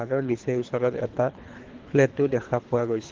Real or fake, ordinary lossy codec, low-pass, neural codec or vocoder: fake; Opus, 16 kbps; 7.2 kHz; codec, 24 kHz, 3 kbps, HILCodec